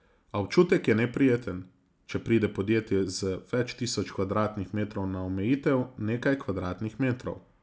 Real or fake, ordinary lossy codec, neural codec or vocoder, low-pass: real; none; none; none